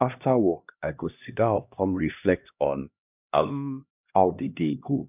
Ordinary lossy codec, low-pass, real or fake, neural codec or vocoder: none; 3.6 kHz; fake; codec, 16 kHz, 1 kbps, X-Codec, HuBERT features, trained on LibriSpeech